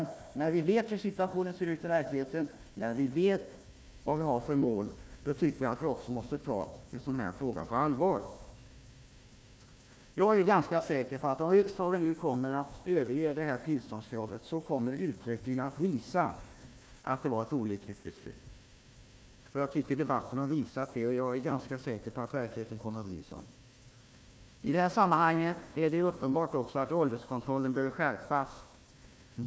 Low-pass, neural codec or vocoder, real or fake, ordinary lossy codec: none; codec, 16 kHz, 1 kbps, FunCodec, trained on Chinese and English, 50 frames a second; fake; none